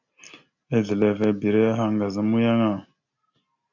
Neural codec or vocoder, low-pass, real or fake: none; 7.2 kHz; real